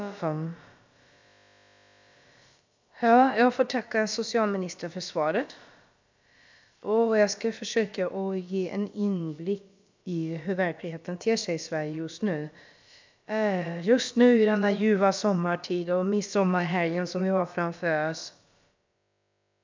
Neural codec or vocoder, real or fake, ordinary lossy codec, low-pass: codec, 16 kHz, about 1 kbps, DyCAST, with the encoder's durations; fake; MP3, 64 kbps; 7.2 kHz